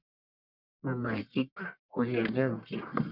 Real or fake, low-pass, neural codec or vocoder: fake; 5.4 kHz; codec, 44.1 kHz, 1.7 kbps, Pupu-Codec